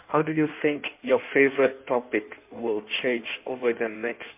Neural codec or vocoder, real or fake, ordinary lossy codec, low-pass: codec, 16 kHz in and 24 kHz out, 1.1 kbps, FireRedTTS-2 codec; fake; MP3, 32 kbps; 3.6 kHz